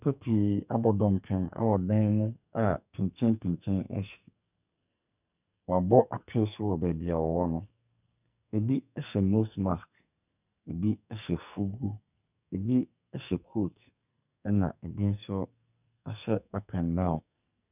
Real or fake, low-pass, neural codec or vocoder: fake; 3.6 kHz; codec, 44.1 kHz, 2.6 kbps, SNAC